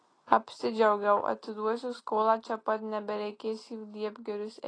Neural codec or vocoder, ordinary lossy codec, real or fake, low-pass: none; AAC, 32 kbps; real; 9.9 kHz